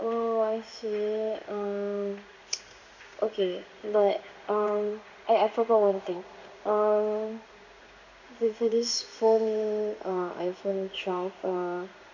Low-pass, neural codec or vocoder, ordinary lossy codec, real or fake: 7.2 kHz; codec, 16 kHz in and 24 kHz out, 1 kbps, XY-Tokenizer; none; fake